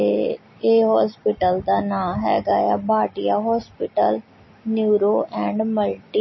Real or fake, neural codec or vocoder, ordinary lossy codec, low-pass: real; none; MP3, 24 kbps; 7.2 kHz